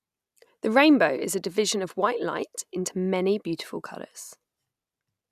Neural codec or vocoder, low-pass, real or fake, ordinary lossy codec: none; 14.4 kHz; real; none